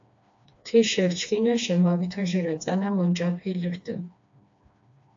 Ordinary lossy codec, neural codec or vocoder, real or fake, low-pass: AAC, 64 kbps; codec, 16 kHz, 2 kbps, FreqCodec, smaller model; fake; 7.2 kHz